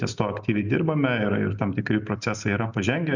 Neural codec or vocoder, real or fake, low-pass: none; real; 7.2 kHz